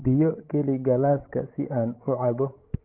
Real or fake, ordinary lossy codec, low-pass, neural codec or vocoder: fake; Opus, 16 kbps; 3.6 kHz; codec, 16 kHz, 16 kbps, FreqCodec, larger model